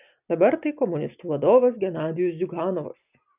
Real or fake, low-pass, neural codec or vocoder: real; 3.6 kHz; none